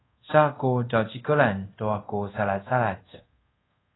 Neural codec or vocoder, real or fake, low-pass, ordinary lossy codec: codec, 24 kHz, 0.5 kbps, DualCodec; fake; 7.2 kHz; AAC, 16 kbps